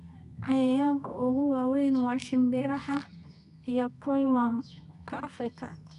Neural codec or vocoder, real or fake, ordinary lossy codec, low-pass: codec, 24 kHz, 0.9 kbps, WavTokenizer, medium music audio release; fake; none; 10.8 kHz